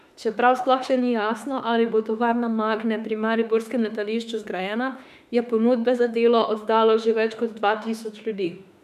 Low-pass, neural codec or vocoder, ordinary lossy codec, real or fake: 14.4 kHz; autoencoder, 48 kHz, 32 numbers a frame, DAC-VAE, trained on Japanese speech; none; fake